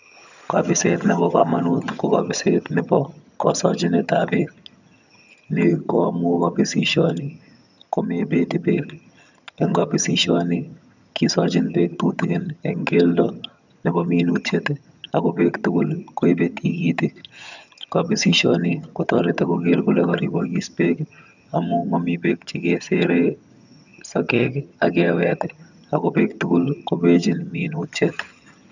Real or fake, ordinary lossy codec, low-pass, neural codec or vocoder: fake; none; 7.2 kHz; vocoder, 22.05 kHz, 80 mel bands, HiFi-GAN